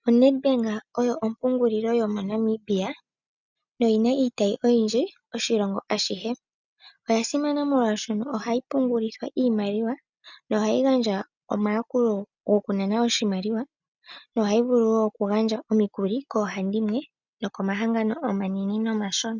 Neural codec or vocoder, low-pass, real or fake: none; 7.2 kHz; real